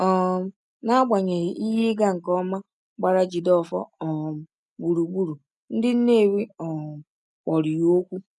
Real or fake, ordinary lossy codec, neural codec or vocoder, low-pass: real; none; none; none